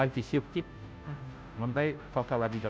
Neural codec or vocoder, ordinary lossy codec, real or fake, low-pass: codec, 16 kHz, 0.5 kbps, FunCodec, trained on Chinese and English, 25 frames a second; none; fake; none